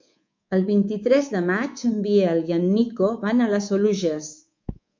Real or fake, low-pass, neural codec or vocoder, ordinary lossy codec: fake; 7.2 kHz; codec, 24 kHz, 3.1 kbps, DualCodec; MP3, 64 kbps